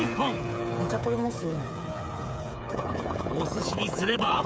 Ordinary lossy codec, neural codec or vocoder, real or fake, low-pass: none; codec, 16 kHz, 8 kbps, FreqCodec, smaller model; fake; none